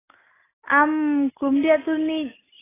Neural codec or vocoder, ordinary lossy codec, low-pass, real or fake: none; AAC, 16 kbps; 3.6 kHz; real